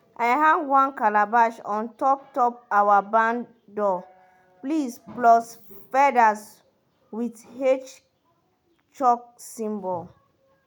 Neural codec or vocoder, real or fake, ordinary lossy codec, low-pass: none; real; none; none